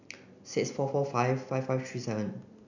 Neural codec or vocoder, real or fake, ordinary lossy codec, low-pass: none; real; none; 7.2 kHz